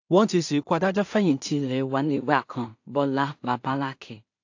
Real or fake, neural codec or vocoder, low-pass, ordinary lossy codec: fake; codec, 16 kHz in and 24 kHz out, 0.4 kbps, LongCat-Audio-Codec, two codebook decoder; 7.2 kHz; AAC, 48 kbps